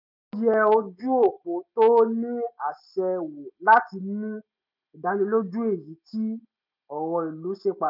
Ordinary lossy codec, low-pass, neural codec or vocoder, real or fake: none; 5.4 kHz; none; real